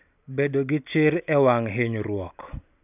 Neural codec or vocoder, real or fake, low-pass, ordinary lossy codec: none; real; 3.6 kHz; none